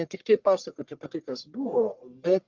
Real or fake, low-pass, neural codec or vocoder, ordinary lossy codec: fake; 7.2 kHz; codec, 44.1 kHz, 1.7 kbps, Pupu-Codec; Opus, 32 kbps